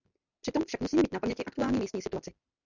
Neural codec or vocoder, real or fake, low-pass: none; real; 7.2 kHz